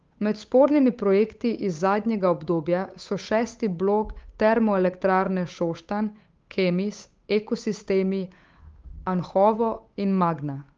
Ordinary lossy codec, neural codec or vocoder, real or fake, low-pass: Opus, 24 kbps; codec, 16 kHz, 8 kbps, FunCodec, trained on Chinese and English, 25 frames a second; fake; 7.2 kHz